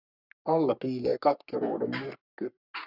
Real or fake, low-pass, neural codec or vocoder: fake; 5.4 kHz; codec, 44.1 kHz, 3.4 kbps, Pupu-Codec